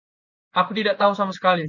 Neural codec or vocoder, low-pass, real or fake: vocoder, 24 kHz, 100 mel bands, Vocos; 7.2 kHz; fake